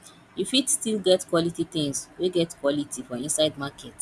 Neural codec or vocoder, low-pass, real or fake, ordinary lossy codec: none; none; real; none